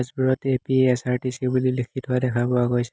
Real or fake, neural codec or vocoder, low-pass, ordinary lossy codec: real; none; none; none